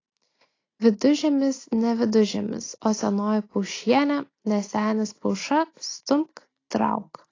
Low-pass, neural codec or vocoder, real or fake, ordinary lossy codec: 7.2 kHz; none; real; AAC, 32 kbps